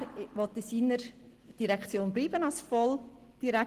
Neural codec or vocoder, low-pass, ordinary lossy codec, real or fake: none; 14.4 kHz; Opus, 16 kbps; real